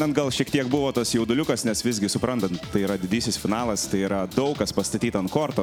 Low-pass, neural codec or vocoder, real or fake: 19.8 kHz; none; real